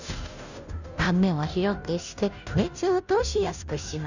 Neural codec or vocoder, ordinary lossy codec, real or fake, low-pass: codec, 16 kHz, 0.5 kbps, FunCodec, trained on Chinese and English, 25 frames a second; none; fake; 7.2 kHz